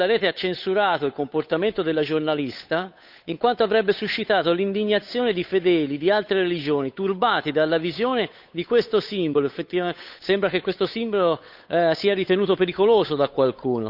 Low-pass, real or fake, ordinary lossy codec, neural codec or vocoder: 5.4 kHz; fake; none; codec, 16 kHz, 8 kbps, FunCodec, trained on Chinese and English, 25 frames a second